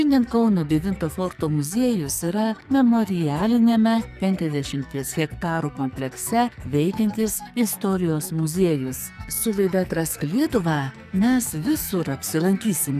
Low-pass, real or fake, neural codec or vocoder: 14.4 kHz; fake; codec, 44.1 kHz, 2.6 kbps, SNAC